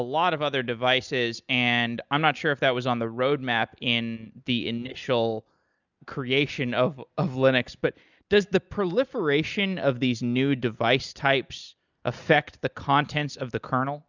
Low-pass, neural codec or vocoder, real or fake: 7.2 kHz; none; real